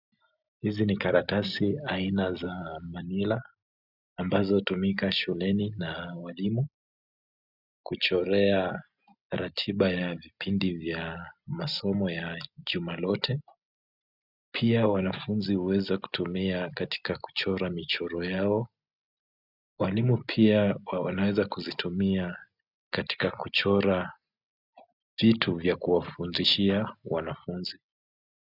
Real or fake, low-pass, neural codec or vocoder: real; 5.4 kHz; none